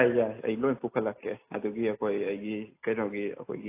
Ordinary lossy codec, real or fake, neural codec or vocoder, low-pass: AAC, 24 kbps; real; none; 3.6 kHz